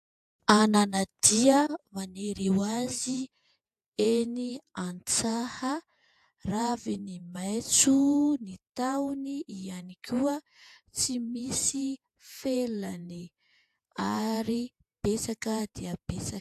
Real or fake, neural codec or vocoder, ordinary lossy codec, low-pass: fake; vocoder, 48 kHz, 128 mel bands, Vocos; AAC, 96 kbps; 14.4 kHz